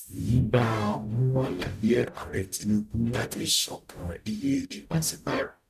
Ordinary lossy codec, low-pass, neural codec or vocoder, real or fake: none; 14.4 kHz; codec, 44.1 kHz, 0.9 kbps, DAC; fake